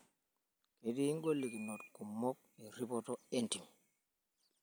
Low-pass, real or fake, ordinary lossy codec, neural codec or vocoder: none; real; none; none